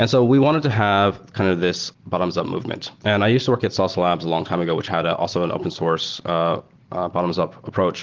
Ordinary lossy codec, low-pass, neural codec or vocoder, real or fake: Opus, 16 kbps; 7.2 kHz; none; real